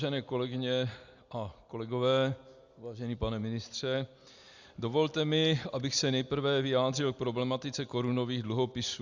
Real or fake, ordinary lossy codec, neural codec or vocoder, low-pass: real; Opus, 64 kbps; none; 7.2 kHz